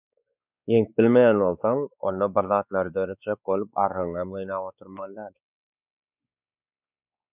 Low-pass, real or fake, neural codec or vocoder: 3.6 kHz; fake; codec, 16 kHz, 4 kbps, X-Codec, WavLM features, trained on Multilingual LibriSpeech